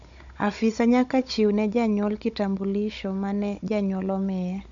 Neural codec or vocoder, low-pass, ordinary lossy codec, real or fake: codec, 16 kHz, 16 kbps, FunCodec, trained on Chinese and English, 50 frames a second; 7.2 kHz; none; fake